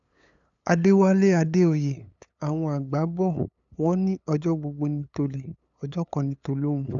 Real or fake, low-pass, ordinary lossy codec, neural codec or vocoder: fake; 7.2 kHz; none; codec, 16 kHz, 8 kbps, FunCodec, trained on LibriTTS, 25 frames a second